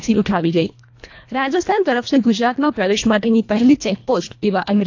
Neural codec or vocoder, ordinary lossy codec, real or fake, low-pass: codec, 24 kHz, 1.5 kbps, HILCodec; AAC, 48 kbps; fake; 7.2 kHz